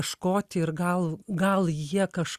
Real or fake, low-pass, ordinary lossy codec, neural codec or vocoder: real; 14.4 kHz; Opus, 64 kbps; none